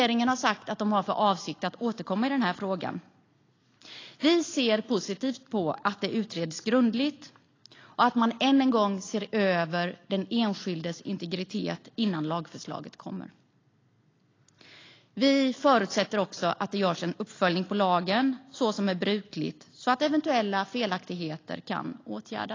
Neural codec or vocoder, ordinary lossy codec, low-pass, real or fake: none; AAC, 32 kbps; 7.2 kHz; real